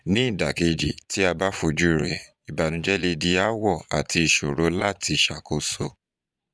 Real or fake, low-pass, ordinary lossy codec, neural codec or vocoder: fake; none; none; vocoder, 22.05 kHz, 80 mel bands, Vocos